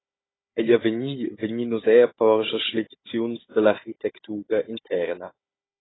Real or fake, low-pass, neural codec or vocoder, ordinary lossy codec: fake; 7.2 kHz; codec, 16 kHz, 16 kbps, FunCodec, trained on Chinese and English, 50 frames a second; AAC, 16 kbps